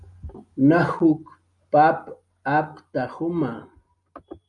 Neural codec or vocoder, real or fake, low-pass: none; real; 10.8 kHz